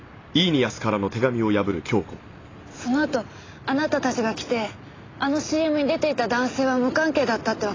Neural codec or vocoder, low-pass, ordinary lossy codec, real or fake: none; 7.2 kHz; AAC, 32 kbps; real